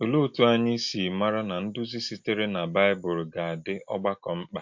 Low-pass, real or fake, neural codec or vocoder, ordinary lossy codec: 7.2 kHz; real; none; MP3, 48 kbps